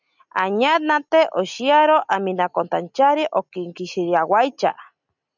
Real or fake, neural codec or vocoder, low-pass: real; none; 7.2 kHz